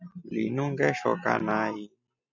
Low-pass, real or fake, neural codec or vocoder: 7.2 kHz; real; none